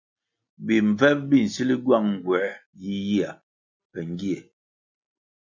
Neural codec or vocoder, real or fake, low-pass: none; real; 7.2 kHz